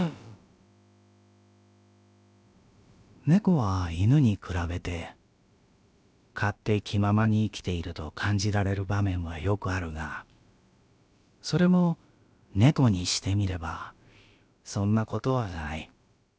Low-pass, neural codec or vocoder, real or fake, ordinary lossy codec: none; codec, 16 kHz, about 1 kbps, DyCAST, with the encoder's durations; fake; none